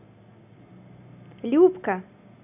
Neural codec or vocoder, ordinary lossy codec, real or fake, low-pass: none; none; real; 3.6 kHz